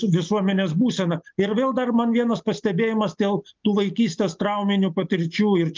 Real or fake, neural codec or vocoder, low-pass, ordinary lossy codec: real; none; 7.2 kHz; Opus, 32 kbps